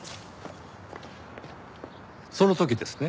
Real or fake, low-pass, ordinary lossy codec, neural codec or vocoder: real; none; none; none